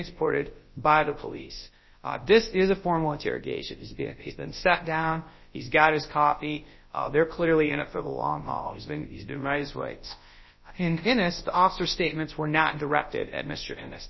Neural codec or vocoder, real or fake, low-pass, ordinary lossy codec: codec, 24 kHz, 0.9 kbps, WavTokenizer, large speech release; fake; 7.2 kHz; MP3, 24 kbps